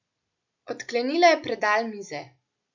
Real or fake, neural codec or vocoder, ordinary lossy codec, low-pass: real; none; none; 7.2 kHz